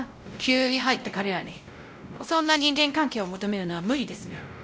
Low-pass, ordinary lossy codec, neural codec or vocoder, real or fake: none; none; codec, 16 kHz, 0.5 kbps, X-Codec, WavLM features, trained on Multilingual LibriSpeech; fake